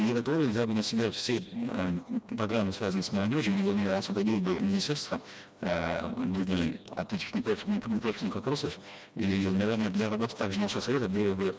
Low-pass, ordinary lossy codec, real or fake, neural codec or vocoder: none; none; fake; codec, 16 kHz, 1 kbps, FreqCodec, smaller model